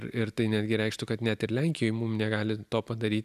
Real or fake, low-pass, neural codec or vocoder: real; 14.4 kHz; none